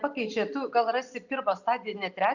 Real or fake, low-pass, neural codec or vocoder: real; 7.2 kHz; none